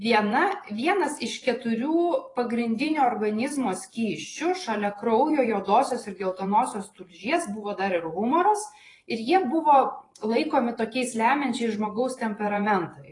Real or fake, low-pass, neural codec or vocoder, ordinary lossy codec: fake; 10.8 kHz; vocoder, 44.1 kHz, 128 mel bands every 512 samples, BigVGAN v2; AAC, 32 kbps